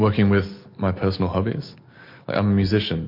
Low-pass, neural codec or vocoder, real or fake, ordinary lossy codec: 5.4 kHz; none; real; MP3, 32 kbps